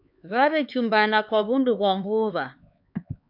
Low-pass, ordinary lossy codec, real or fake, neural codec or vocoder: 5.4 kHz; MP3, 48 kbps; fake; codec, 16 kHz, 4 kbps, X-Codec, HuBERT features, trained on LibriSpeech